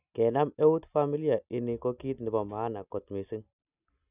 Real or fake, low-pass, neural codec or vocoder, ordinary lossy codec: real; 3.6 kHz; none; none